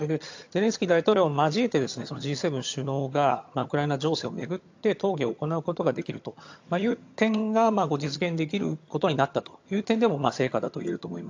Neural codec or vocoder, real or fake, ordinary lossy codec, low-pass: vocoder, 22.05 kHz, 80 mel bands, HiFi-GAN; fake; AAC, 48 kbps; 7.2 kHz